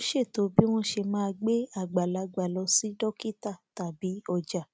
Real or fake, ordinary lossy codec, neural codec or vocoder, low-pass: real; none; none; none